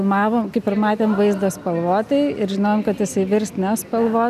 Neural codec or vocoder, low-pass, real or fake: none; 14.4 kHz; real